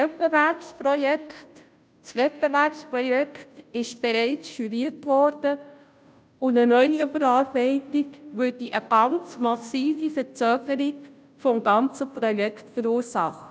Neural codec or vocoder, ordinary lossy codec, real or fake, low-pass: codec, 16 kHz, 0.5 kbps, FunCodec, trained on Chinese and English, 25 frames a second; none; fake; none